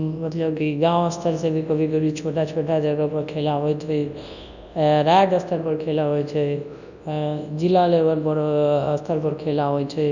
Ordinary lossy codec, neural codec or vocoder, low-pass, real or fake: none; codec, 24 kHz, 0.9 kbps, WavTokenizer, large speech release; 7.2 kHz; fake